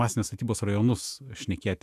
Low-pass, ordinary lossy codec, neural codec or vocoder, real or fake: 14.4 kHz; AAC, 96 kbps; autoencoder, 48 kHz, 128 numbers a frame, DAC-VAE, trained on Japanese speech; fake